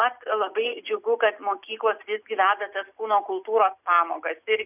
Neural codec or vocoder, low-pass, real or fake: none; 3.6 kHz; real